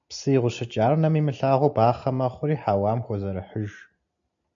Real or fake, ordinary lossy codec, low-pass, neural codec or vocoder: real; AAC, 64 kbps; 7.2 kHz; none